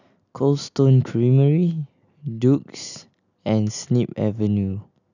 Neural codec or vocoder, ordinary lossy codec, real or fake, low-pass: none; none; real; 7.2 kHz